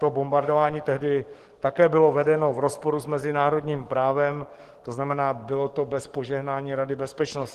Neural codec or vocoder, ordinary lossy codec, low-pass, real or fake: codec, 44.1 kHz, 7.8 kbps, DAC; Opus, 16 kbps; 14.4 kHz; fake